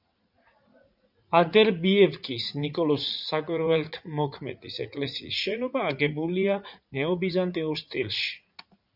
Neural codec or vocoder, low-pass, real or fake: vocoder, 44.1 kHz, 80 mel bands, Vocos; 5.4 kHz; fake